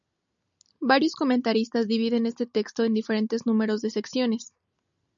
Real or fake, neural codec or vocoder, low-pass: real; none; 7.2 kHz